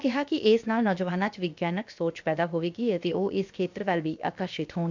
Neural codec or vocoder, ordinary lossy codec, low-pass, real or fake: codec, 16 kHz, about 1 kbps, DyCAST, with the encoder's durations; MP3, 64 kbps; 7.2 kHz; fake